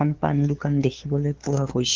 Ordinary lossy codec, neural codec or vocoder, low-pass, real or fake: Opus, 16 kbps; codec, 16 kHz, 2 kbps, FunCodec, trained on LibriTTS, 25 frames a second; 7.2 kHz; fake